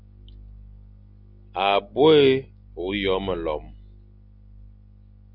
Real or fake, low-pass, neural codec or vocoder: real; 5.4 kHz; none